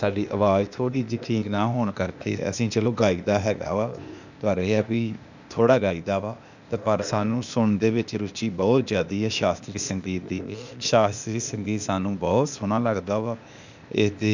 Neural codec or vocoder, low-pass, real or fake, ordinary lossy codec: codec, 16 kHz, 0.8 kbps, ZipCodec; 7.2 kHz; fake; none